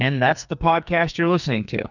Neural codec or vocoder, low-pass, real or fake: codec, 44.1 kHz, 2.6 kbps, SNAC; 7.2 kHz; fake